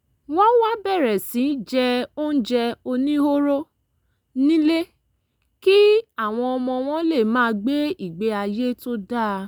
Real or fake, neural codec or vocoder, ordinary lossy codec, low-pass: real; none; none; none